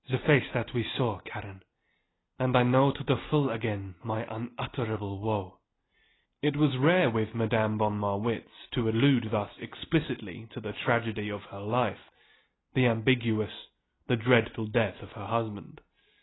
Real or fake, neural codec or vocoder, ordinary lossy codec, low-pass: real; none; AAC, 16 kbps; 7.2 kHz